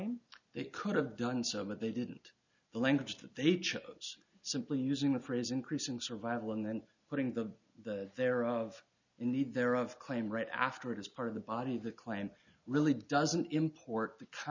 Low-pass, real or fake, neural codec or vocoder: 7.2 kHz; real; none